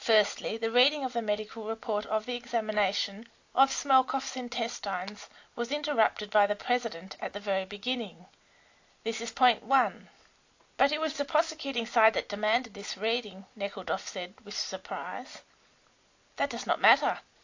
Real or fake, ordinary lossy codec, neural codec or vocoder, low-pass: real; AAC, 48 kbps; none; 7.2 kHz